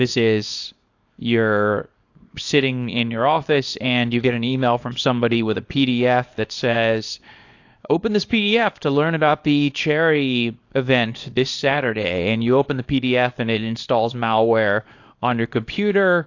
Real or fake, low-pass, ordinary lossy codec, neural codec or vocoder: fake; 7.2 kHz; AAC, 48 kbps; codec, 24 kHz, 0.9 kbps, WavTokenizer, small release